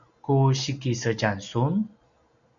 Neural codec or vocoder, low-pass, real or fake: none; 7.2 kHz; real